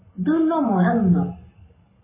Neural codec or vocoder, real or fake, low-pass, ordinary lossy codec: codec, 44.1 kHz, 7.8 kbps, Pupu-Codec; fake; 3.6 kHz; MP3, 16 kbps